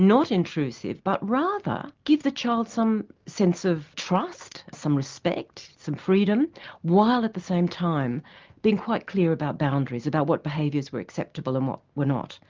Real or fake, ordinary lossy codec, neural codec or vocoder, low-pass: real; Opus, 32 kbps; none; 7.2 kHz